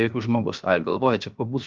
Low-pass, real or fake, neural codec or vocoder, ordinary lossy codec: 7.2 kHz; fake; codec, 16 kHz, 0.7 kbps, FocalCodec; Opus, 24 kbps